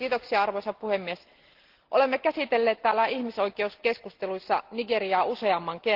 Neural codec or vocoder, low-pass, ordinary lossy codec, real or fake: none; 5.4 kHz; Opus, 16 kbps; real